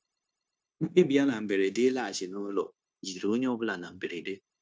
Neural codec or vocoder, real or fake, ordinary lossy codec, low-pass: codec, 16 kHz, 0.9 kbps, LongCat-Audio-Codec; fake; none; none